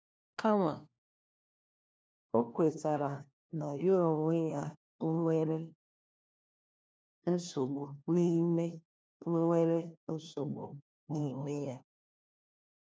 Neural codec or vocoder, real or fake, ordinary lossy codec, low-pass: codec, 16 kHz, 1 kbps, FunCodec, trained on LibriTTS, 50 frames a second; fake; none; none